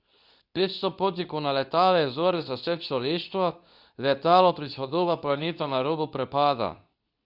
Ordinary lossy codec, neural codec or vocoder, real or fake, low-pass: none; codec, 24 kHz, 0.9 kbps, WavTokenizer, medium speech release version 2; fake; 5.4 kHz